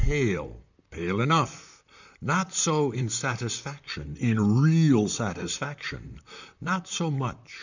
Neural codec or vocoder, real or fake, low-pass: vocoder, 44.1 kHz, 128 mel bands, Pupu-Vocoder; fake; 7.2 kHz